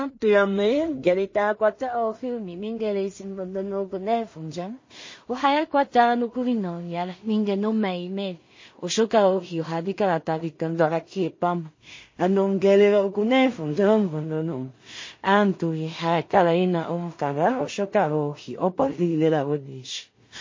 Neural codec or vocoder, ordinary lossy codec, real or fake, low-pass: codec, 16 kHz in and 24 kHz out, 0.4 kbps, LongCat-Audio-Codec, two codebook decoder; MP3, 32 kbps; fake; 7.2 kHz